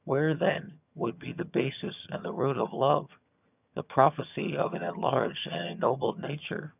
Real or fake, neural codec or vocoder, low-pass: fake; vocoder, 22.05 kHz, 80 mel bands, HiFi-GAN; 3.6 kHz